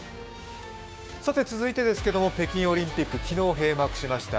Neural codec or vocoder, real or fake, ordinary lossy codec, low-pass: codec, 16 kHz, 6 kbps, DAC; fake; none; none